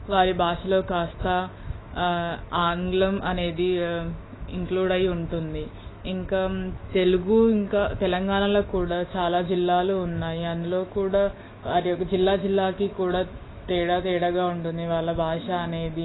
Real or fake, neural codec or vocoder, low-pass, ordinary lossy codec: fake; autoencoder, 48 kHz, 128 numbers a frame, DAC-VAE, trained on Japanese speech; 7.2 kHz; AAC, 16 kbps